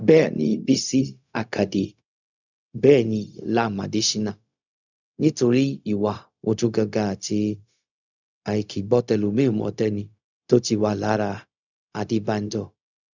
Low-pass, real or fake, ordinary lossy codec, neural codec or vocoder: 7.2 kHz; fake; none; codec, 16 kHz, 0.4 kbps, LongCat-Audio-Codec